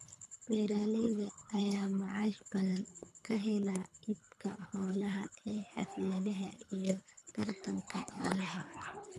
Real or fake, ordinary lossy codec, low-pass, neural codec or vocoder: fake; none; none; codec, 24 kHz, 3 kbps, HILCodec